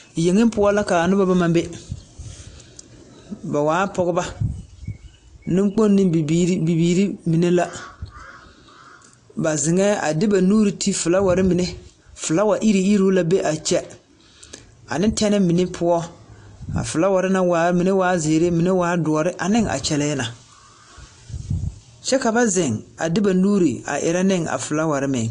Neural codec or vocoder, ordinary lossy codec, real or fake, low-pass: none; AAC, 48 kbps; real; 9.9 kHz